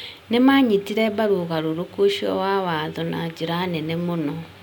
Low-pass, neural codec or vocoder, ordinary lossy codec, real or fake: 19.8 kHz; none; none; real